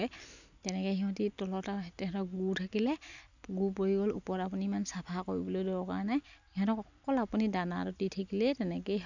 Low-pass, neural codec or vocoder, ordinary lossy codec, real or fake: 7.2 kHz; none; none; real